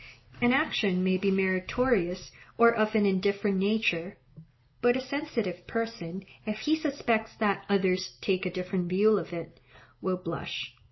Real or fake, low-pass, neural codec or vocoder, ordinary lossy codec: real; 7.2 kHz; none; MP3, 24 kbps